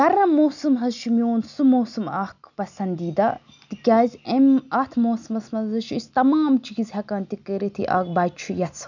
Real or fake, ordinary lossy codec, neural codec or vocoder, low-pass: real; none; none; 7.2 kHz